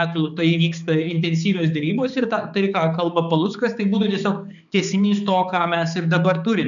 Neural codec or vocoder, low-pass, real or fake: codec, 16 kHz, 4 kbps, X-Codec, HuBERT features, trained on balanced general audio; 7.2 kHz; fake